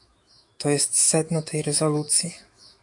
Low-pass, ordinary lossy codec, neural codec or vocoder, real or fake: 10.8 kHz; AAC, 64 kbps; autoencoder, 48 kHz, 128 numbers a frame, DAC-VAE, trained on Japanese speech; fake